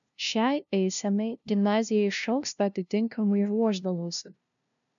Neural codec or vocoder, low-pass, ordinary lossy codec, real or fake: codec, 16 kHz, 0.5 kbps, FunCodec, trained on LibriTTS, 25 frames a second; 7.2 kHz; MP3, 96 kbps; fake